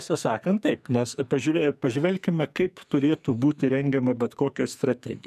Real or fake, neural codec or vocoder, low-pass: fake; codec, 44.1 kHz, 2.6 kbps, SNAC; 14.4 kHz